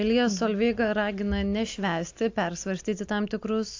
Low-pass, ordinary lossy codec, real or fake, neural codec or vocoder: 7.2 kHz; AAC, 48 kbps; real; none